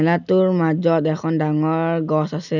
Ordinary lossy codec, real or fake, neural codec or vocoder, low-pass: none; real; none; 7.2 kHz